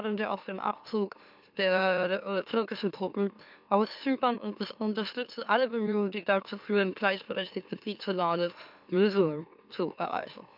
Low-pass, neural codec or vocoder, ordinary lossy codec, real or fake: 5.4 kHz; autoencoder, 44.1 kHz, a latent of 192 numbers a frame, MeloTTS; none; fake